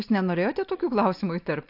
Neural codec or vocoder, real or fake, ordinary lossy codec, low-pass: none; real; AAC, 48 kbps; 5.4 kHz